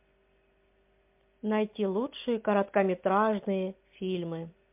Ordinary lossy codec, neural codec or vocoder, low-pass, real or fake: MP3, 32 kbps; none; 3.6 kHz; real